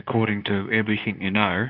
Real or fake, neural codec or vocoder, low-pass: fake; codec, 24 kHz, 0.9 kbps, WavTokenizer, medium speech release version 2; 5.4 kHz